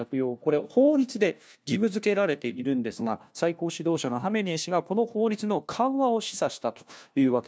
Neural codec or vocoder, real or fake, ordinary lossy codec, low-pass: codec, 16 kHz, 1 kbps, FunCodec, trained on LibriTTS, 50 frames a second; fake; none; none